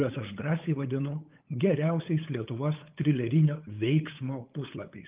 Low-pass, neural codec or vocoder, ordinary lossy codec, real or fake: 3.6 kHz; codec, 16 kHz, 8 kbps, FunCodec, trained on LibriTTS, 25 frames a second; Opus, 24 kbps; fake